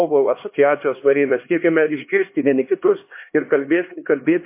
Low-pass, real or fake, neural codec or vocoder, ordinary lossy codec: 3.6 kHz; fake; codec, 16 kHz, 2 kbps, X-Codec, HuBERT features, trained on LibriSpeech; MP3, 24 kbps